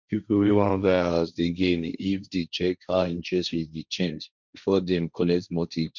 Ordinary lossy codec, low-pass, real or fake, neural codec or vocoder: none; 7.2 kHz; fake; codec, 16 kHz, 1.1 kbps, Voila-Tokenizer